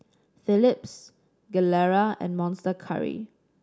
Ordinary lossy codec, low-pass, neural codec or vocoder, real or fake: none; none; none; real